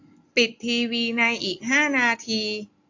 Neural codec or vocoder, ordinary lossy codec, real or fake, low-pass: none; AAC, 48 kbps; real; 7.2 kHz